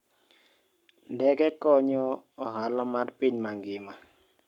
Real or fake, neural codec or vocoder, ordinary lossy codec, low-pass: fake; codec, 44.1 kHz, 7.8 kbps, Pupu-Codec; none; 19.8 kHz